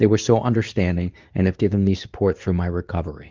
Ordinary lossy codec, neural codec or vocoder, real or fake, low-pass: Opus, 32 kbps; codec, 24 kHz, 0.9 kbps, WavTokenizer, medium speech release version 2; fake; 7.2 kHz